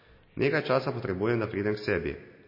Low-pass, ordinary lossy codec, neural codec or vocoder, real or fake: 5.4 kHz; MP3, 24 kbps; none; real